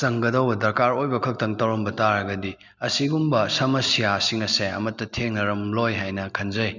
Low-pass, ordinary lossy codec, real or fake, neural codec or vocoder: 7.2 kHz; none; real; none